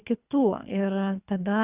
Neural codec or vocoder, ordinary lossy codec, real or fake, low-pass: codec, 24 kHz, 3 kbps, HILCodec; Opus, 64 kbps; fake; 3.6 kHz